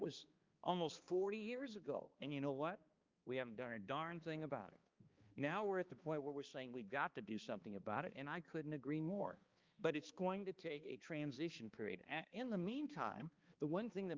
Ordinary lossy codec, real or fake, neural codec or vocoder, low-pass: Opus, 24 kbps; fake; codec, 16 kHz, 2 kbps, X-Codec, HuBERT features, trained on balanced general audio; 7.2 kHz